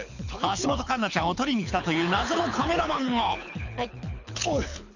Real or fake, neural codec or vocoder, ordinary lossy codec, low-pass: fake; codec, 24 kHz, 6 kbps, HILCodec; none; 7.2 kHz